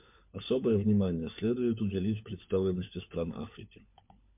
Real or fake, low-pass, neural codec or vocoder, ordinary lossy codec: fake; 3.6 kHz; codec, 16 kHz, 4 kbps, FunCodec, trained on LibriTTS, 50 frames a second; MP3, 32 kbps